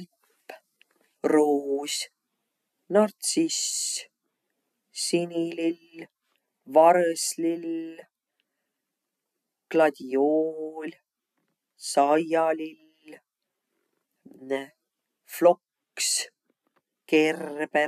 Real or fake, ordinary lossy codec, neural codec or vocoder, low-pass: real; none; none; 14.4 kHz